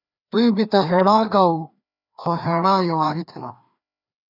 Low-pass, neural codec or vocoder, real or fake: 5.4 kHz; codec, 16 kHz, 2 kbps, FreqCodec, larger model; fake